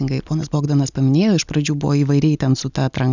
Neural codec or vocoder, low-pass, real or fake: none; 7.2 kHz; real